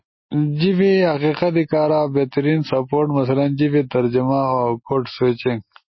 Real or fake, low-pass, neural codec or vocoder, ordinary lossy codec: real; 7.2 kHz; none; MP3, 24 kbps